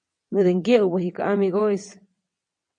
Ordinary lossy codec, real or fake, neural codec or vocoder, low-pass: MP3, 48 kbps; fake; vocoder, 22.05 kHz, 80 mel bands, WaveNeXt; 9.9 kHz